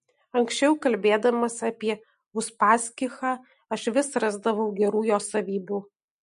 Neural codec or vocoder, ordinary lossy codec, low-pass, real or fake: none; MP3, 48 kbps; 14.4 kHz; real